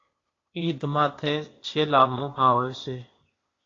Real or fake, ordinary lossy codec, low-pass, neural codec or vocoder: fake; AAC, 32 kbps; 7.2 kHz; codec, 16 kHz, 0.8 kbps, ZipCodec